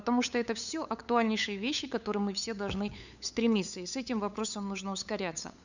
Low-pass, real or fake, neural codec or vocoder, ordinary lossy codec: 7.2 kHz; fake; codec, 16 kHz, 8 kbps, FunCodec, trained on LibriTTS, 25 frames a second; none